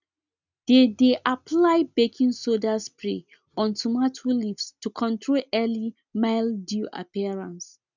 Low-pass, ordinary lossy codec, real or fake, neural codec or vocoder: 7.2 kHz; none; real; none